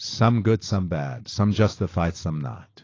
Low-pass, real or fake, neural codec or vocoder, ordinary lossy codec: 7.2 kHz; real; none; AAC, 32 kbps